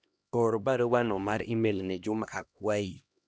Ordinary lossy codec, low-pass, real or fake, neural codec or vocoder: none; none; fake; codec, 16 kHz, 1 kbps, X-Codec, HuBERT features, trained on LibriSpeech